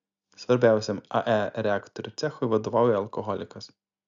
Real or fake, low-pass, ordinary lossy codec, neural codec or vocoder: real; 7.2 kHz; Opus, 64 kbps; none